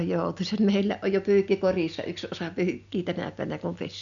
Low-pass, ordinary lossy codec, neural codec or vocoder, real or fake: 7.2 kHz; none; none; real